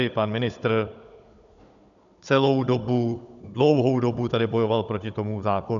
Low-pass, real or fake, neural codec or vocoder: 7.2 kHz; fake; codec, 16 kHz, 16 kbps, FunCodec, trained on Chinese and English, 50 frames a second